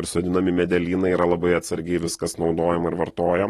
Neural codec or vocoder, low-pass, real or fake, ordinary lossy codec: none; 14.4 kHz; real; AAC, 32 kbps